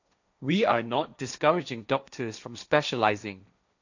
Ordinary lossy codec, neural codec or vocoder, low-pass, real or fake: none; codec, 16 kHz, 1.1 kbps, Voila-Tokenizer; 7.2 kHz; fake